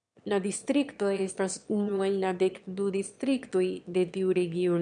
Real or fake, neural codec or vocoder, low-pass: fake; autoencoder, 22.05 kHz, a latent of 192 numbers a frame, VITS, trained on one speaker; 9.9 kHz